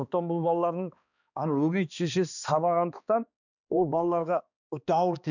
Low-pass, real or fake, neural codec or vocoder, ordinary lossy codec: 7.2 kHz; fake; codec, 16 kHz, 2 kbps, X-Codec, HuBERT features, trained on balanced general audio; none